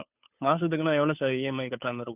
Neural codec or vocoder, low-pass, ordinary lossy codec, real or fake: codec, 16 kHz, 2 kbps, FunCodec, trained on Chinese and English, 25 frames a second; 3.6 kHz; none; fake